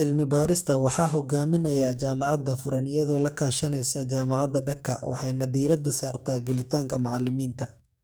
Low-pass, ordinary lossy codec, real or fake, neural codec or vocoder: none; none; fake; codec, 44.1 kHz, 2.6 kbps, DAC